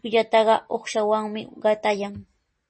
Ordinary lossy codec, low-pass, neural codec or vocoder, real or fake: MP3, 32 kbps; 10.8 kHz; none; real